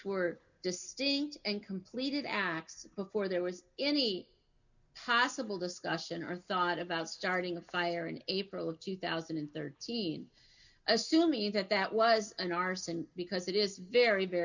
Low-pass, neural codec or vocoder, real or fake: 7.2 kHz; none; real